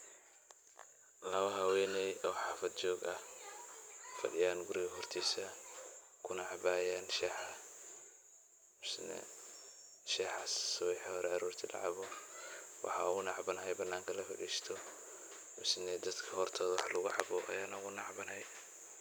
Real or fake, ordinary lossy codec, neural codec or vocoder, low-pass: real; none; none; none